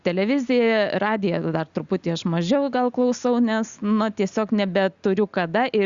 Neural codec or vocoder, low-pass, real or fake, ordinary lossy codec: none; 7.2 kHz; real; Opus, 64 kbps